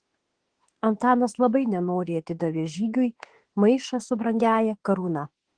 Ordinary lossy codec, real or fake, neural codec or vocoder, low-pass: Opus, 16 kbps; fake; autoencoder, 48 kHz, 32 numbers a frame, DAC-VAE, trained on Japanese speech; 9.9 kHz